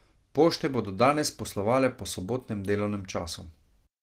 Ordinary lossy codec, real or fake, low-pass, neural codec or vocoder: Opus, 16 kbps; real; 14.4 kHz; none